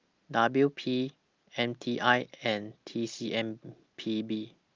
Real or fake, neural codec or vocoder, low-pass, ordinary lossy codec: real; none; 7.2 kHz; Opus, 24 kbps